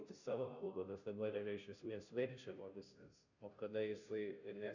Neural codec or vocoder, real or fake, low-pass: codec, 16 kHz, 0.5 kbps, FunCodec, trained on Chinese and English, 25 frames a second; fake; 7.2 kHz